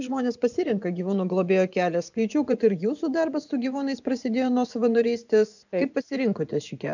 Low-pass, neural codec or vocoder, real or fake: 7.2 kHz; vocoder, 24 kHz, 100 mel bands, Vocos; fake